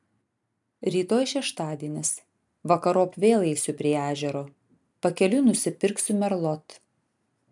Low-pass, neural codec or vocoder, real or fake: 10.8 kHz; none; real